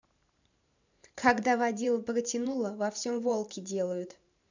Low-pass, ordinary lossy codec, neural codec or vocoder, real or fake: 7.2 kHz; none; vocoder, 44.1 kHz, 128 mel bands every 512 samples, BigVGAN v2; fake